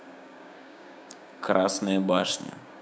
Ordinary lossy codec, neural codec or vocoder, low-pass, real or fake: none; codec, 16 kHz, 6 kbps, DAC; none; fake